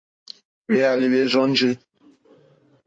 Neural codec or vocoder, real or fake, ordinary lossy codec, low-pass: codec, 16 kHz in and 24 kHz out, 2.2 kbps, FireRedTTS-2 codec; fake; MP3, 48 kbps; 9.9 kHz